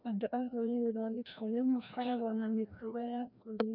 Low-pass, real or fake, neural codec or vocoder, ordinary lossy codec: 5.4 kHz; fake; codec, 16 kHz, 1 kbps, FreqCodec, larger model; none